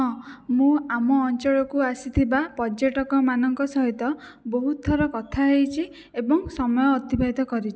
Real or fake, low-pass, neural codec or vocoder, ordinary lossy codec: real; none; none; none